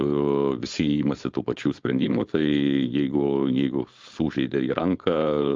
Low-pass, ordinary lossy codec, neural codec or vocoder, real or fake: 7.2 kHz; Opus, 32 kbps; codec, 16 kHz, 4.8 kbps, FACodec; fake